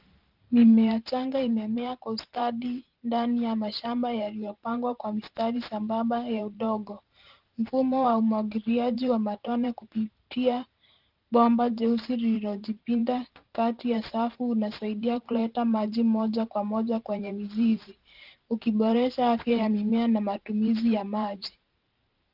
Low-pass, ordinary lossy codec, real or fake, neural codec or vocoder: 5.4 kHz; Opus, 32 kbps; fake; vocoder, 44.1 kHz, 128 mel bands every 512 samples, BigVGAN v2